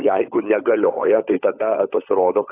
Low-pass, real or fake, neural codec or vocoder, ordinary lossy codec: 3.6 kHz; fake; codec, 16 kHz, 16 kbps, FunCodec, trained on LibriTTS, 50 frames a second; AAC, 24 kbps